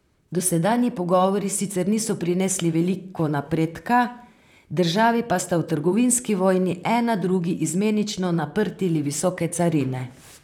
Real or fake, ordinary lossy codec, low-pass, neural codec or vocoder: fake; none; 19.8 kHz; vocoder, 44.1 kHz, 128 mel bands, Pupu-Vocoder